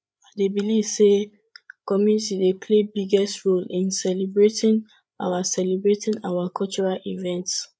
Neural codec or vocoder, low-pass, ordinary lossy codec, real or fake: codec, 16 kHz, 8 kbps, FreqCodec, larger model; none; none; fake